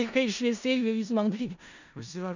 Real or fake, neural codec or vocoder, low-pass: fake; codec, 16 kHz in and 24 kHz out, 0.4 kbps, LongCat-Audio-Codec, four codebook decoder; 7.2 kHz